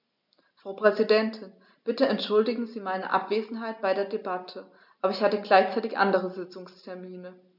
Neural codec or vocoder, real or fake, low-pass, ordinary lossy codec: none; real; 5.4 kHz; none